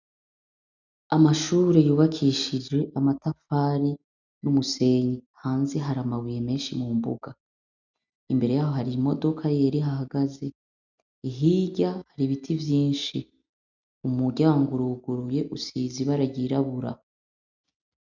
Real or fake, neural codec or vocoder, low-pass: real; none; 7.2 kHz